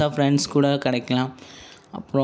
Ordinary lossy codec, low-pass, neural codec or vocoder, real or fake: none; none; none; real